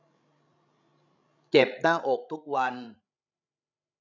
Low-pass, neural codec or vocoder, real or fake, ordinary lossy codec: 7.2 kHz; codec, 16 kHz, 16 kbps, FreqCodec, larger model; fake; none